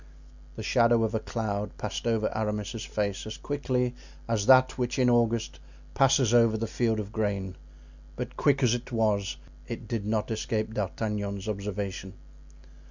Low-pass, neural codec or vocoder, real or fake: 7.2 kHz; none; real